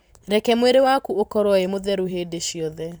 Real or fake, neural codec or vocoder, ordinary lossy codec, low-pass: fake; vocoder, 44.1 kHz, 128 mel bands every 512 samples, BigVGAN v2; none; none